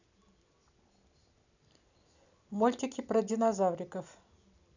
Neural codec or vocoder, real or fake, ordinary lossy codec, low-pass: none; real; none; 7.2 kHz